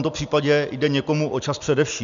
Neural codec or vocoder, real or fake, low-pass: none; real; 7.2 kHz